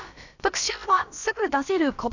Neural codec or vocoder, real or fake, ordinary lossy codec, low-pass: codec, 16 kHz, about 1 kbps, DyCAST, with the encoder's durations; fake; none; 7.2 kHz